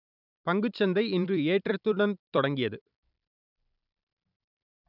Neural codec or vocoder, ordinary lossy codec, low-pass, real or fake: vocoder, 44.1 kHz, 128 mel bands every 256 samples, BigVGAN v2; none; 5.4 kHz; fake